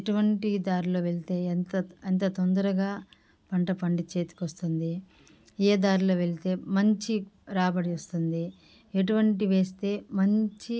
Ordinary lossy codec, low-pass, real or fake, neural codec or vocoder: none; none; real; none